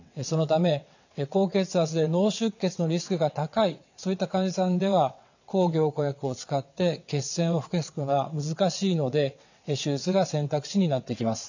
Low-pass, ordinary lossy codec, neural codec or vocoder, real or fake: 7.2 kHz; none; vocoder, 22.05 kHz, 80 mel bands, WaveNeXt; fake